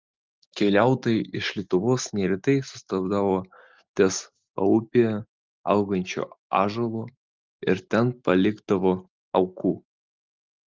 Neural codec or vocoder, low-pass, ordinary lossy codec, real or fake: none; 7.2 kHz; Opus, 32 kbps; real